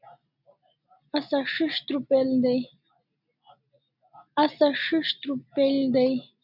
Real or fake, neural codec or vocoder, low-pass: real; none; 5.4 kHz